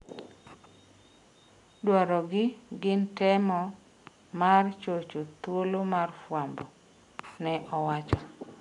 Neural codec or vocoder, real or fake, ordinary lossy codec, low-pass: none; real; AAC, 64 kbps; 10.8 kHz